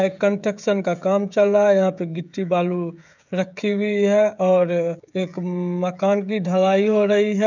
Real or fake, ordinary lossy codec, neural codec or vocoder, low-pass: fake; none; codec, 16 kHz, 16 kbps, FreqCodec, smaller model; 7.2 kHz